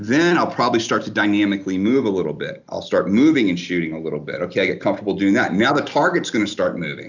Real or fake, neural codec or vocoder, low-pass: real; none; 7.2 kHz